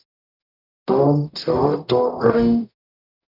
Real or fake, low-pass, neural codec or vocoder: fake; 5.4 kHz; codec, 44.1 kHz, 0.9 kbps, DAC